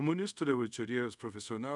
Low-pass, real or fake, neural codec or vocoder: 10.8 kHz; fake; codec, 24 kHz, 0.5 kbps, DualCodec